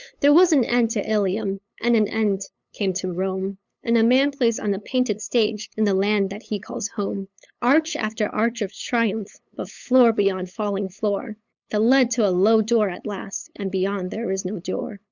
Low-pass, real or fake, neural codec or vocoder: 7.2 kHz; fake; codec, 16 kHz, 8 kbps, FunCodec, trained on LibriTTS, 25 frames a second